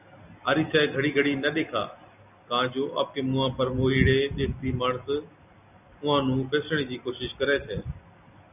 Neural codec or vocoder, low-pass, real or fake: none; 3.6 kHz; real